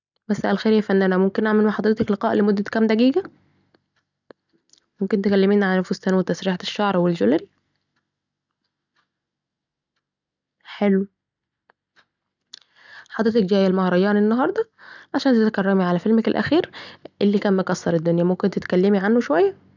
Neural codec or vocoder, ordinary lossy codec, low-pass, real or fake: none; none; 7.2 kHz; real